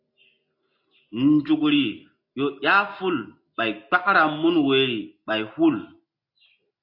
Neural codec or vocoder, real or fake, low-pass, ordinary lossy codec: none; real; 5.4 kHz; MP3, 32 kbps